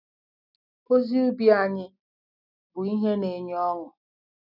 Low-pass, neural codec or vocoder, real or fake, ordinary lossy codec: 5.4 kHz; none; real; none